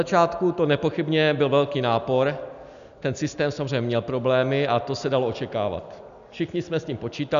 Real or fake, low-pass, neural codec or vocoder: real; 7.2 kHz; none